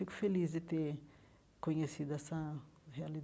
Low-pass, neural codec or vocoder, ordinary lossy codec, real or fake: none; none; none; real